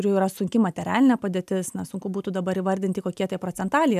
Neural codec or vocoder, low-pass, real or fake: none; 14.4 kHz; real